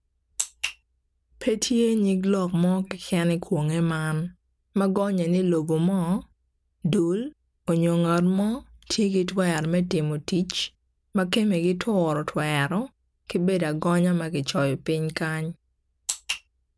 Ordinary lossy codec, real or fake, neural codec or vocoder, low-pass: none; real; none; none